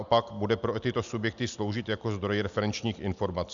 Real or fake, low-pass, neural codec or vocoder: real; 7.2 kHz; none